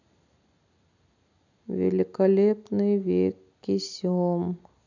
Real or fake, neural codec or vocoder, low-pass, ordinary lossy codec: real; none; 7.2 kHz; none